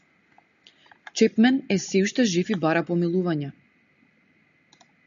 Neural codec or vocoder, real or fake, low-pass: none; real; 7.2 kHz